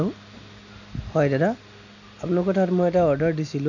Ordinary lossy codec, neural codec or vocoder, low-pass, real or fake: none; none; 7.2 kHz; real